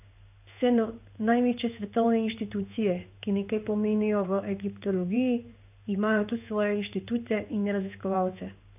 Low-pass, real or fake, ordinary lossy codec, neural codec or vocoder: 3.6 kHz; fake; none; codec, 16 kHz in and 24 kHz out, 1 kbps, XY-Tokenizer